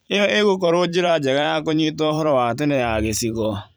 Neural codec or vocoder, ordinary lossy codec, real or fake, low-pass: vocoder, 44.1 kHz, 128 mel bands every 512 samples, BigVGAN v2; none; fake; none